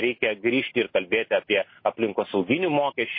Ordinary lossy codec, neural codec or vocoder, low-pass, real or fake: MP3, 24 kbps; none; 5.4 kHz; real